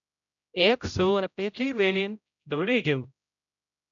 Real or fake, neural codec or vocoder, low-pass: fake; codec, 16 kHz, 0.5 kbps, X-Codec, HuBERT features, trained on general audio; 7.2 kHz